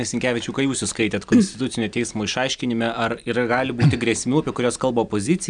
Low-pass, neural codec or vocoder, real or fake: 9.9 kHz; none; real